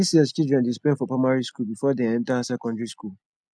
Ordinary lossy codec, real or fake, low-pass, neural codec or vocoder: none; real; none; none